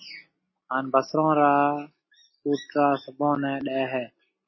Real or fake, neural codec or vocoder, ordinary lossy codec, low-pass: real; none; MP3, 24 kbps; 7.2 kHz